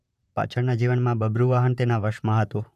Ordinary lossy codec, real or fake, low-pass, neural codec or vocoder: none; fake; 14.4 kHz; vocoder, 44.1 kHz, 128 mel bands, Pupu-Vocoder